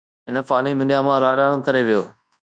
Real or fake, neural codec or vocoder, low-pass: fake; codec, 24 kHz, 0.9 kbps, WavTokenizer, large speech release; 9.9 kHz